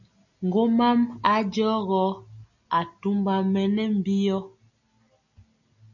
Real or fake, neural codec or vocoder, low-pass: real; none; 7.2 kHz